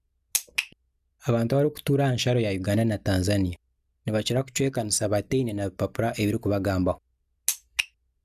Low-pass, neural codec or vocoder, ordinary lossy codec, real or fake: 14.4 kHz; none; none; real